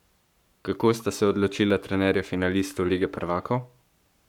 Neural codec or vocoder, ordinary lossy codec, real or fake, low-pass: codec, 44.1 kHz, 7.8 kbps, Pupu-Codec; none; fake; 19.8 kHz